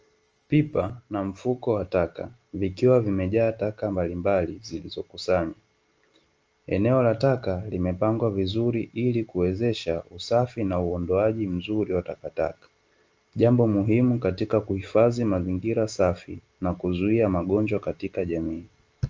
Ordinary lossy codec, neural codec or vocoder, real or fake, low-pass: Opus, 24 kbps; none; real; 7.2 kHz